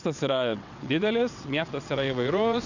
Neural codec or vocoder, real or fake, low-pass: vocoder, 22.05 kHz, 80 mel bands, WaveNeXt; fake; 7.2 kHz